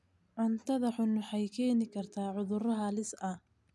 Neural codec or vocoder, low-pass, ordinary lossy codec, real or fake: none; none; none; real